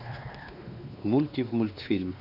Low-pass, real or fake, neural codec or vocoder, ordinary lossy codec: 5.4 kHz; fake; codec, 16 kHz, 2 kbps, X-Codec, WavLM features, trained on Multilingual LibriSpeech; none